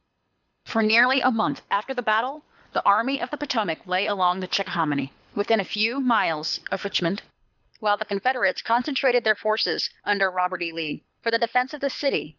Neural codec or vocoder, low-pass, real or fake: codec, 24 kHz, 6 kbps, HILCodec; 7.2 kHz; fake